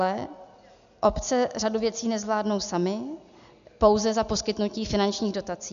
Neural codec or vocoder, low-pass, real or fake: none; 7.2 kHz; real